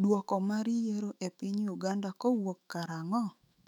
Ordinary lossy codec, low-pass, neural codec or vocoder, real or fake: none; 19.8 kHz; autoencoder, 48 kHz, 128 numbers a frame, DAC-VAE, trained on Japanese speech; fake